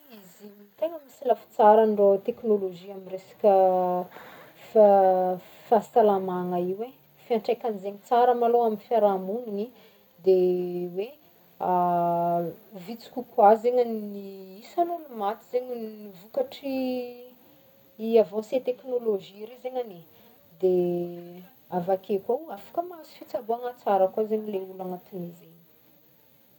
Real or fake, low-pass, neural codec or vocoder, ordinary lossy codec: real; 19.8 kHz; none; none